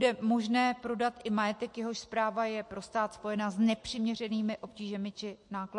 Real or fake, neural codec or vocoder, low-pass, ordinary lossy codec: real; none; 10.8 kHz; MP3, 48 kbps